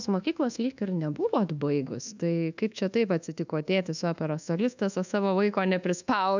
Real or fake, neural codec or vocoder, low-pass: fake; codec, 24 kHz, 1.2 kbps, DualCodec; 7.2 kHz